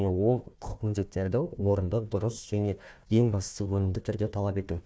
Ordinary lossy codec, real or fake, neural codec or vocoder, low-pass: none; fake; codec, 16 kHz, 1 kbps, FunCodec, trained on Chinese and English, 50 frames a second; none